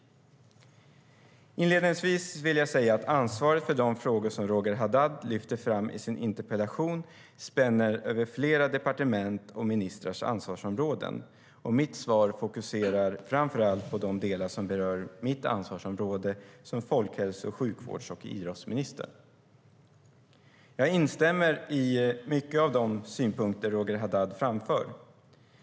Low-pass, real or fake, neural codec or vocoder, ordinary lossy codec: none; real; none; none